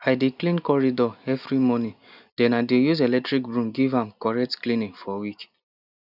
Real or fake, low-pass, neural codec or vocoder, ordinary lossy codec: real; 5.4 kHz; none; none